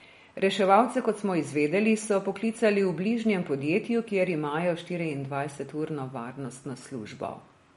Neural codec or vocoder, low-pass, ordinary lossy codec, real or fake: none; 19.8 kHz; MP3, 48 kbps; real